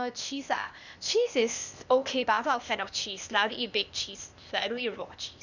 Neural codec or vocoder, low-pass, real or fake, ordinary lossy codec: codec, 16 kHz, 0.8 kbps, ZipCodec; 7.2 kHz; fake; none